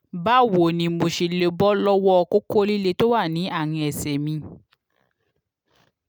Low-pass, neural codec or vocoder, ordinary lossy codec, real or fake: none; none; none; real